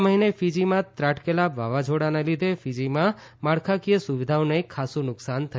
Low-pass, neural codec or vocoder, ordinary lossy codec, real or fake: none; none; none; real